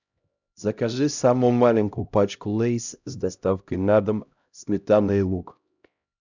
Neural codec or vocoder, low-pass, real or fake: codec, 16 kHz, 0.5 kbps, X-Codec, HuBERT features, trained on LibriSpeech; 7.2 kHz; fake